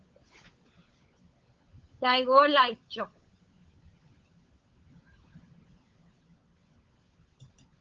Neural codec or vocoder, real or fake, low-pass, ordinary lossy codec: codec, 16 kHz, 16 kbps, FunCodec, trained on LibriTTS, 50 frames a second; fake; 7.2 kHz; Opus, 24 kbps